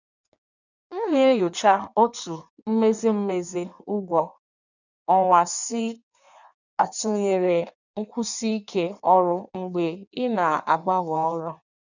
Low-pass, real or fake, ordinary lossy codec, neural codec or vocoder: 7.2 kHz; fake; none; codec, 16 kHz in and 24 kHz out, 1.1 kbps, FireRedTTS-2 codec